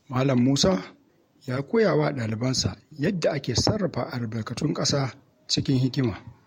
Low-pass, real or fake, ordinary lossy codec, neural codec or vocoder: 19.8 kHz; fake; MP3, 64 kbps; vocoder, 48 kHz, 128 mel bands, Vocos